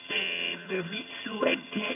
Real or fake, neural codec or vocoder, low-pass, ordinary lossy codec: fake; vocoder, 22.05 kHz, 80 mel bands, HiFi-GAN; 3.6 kHz; none